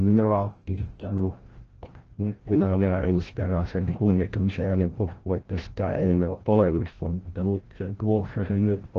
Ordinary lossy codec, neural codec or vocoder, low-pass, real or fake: Opus, 16 kbps; codec, 16 kHz, 0.5 kbps, FreqCodec, larger model; 7.2 kHz; fake